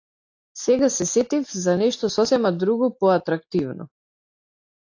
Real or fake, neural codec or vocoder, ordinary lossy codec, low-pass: real; none; AAC, 48 kbps; 7.2 kHz